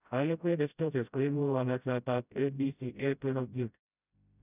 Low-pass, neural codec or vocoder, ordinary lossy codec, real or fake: 3.6 kHz; codec, 16 kHz, 0.5 kbps, FreqCodec, smaller model; none; fake